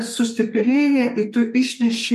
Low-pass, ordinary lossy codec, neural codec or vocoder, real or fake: 14.4 kHz; MP3, 64 kbps; codec, 32 kHz, 1.9 kbps, SNAC; fake